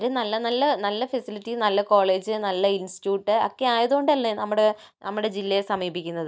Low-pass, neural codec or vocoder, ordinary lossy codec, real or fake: none; none; none; real